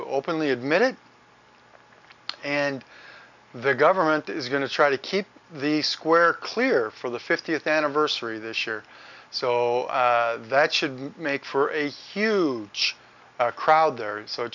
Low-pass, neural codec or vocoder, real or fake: 7.2 kHz; none; real